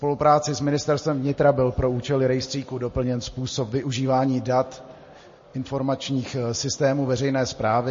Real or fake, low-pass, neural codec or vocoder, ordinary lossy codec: real; 7.2 kHz; none; MP3, 32 kbps